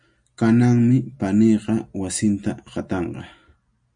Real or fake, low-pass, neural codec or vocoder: real; 9.9 kHz; none